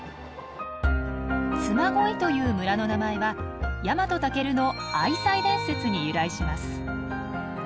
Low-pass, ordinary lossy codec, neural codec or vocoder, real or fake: none; none; none; real